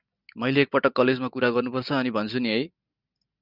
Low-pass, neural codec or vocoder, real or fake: 5.4 kHz; none; real